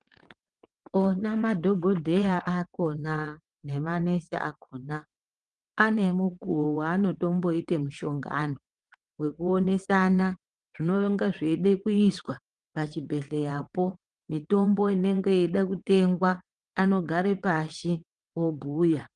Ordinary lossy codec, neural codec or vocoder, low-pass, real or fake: Opus, 24 kbps; vocoder, 22.05 kHz, 80 mel bands, WaveNeXt; 9.9 kHz; fake